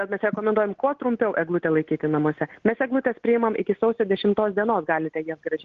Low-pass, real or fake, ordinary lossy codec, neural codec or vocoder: 7.2 kHz; real; Opus, 16 kbps; none